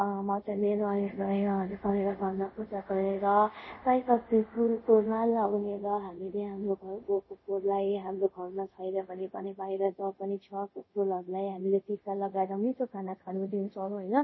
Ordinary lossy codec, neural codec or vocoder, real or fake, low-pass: MP3, 24 kbps; codec, 24 kHz, 0.5 kbps, DualCodec; fake; 7.2 kHz